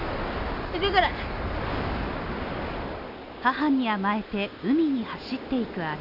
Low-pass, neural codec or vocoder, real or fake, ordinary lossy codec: 5.4 kHz; none; real; none